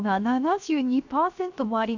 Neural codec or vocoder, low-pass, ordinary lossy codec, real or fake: codec, 16 kHz, 0.7 kbps, FocalCodec; 7.2 kHz; none; fake